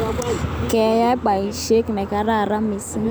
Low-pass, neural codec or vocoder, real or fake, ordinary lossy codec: none; none; real; none